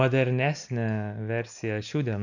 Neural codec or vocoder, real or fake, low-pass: none; real; 7.2 kHz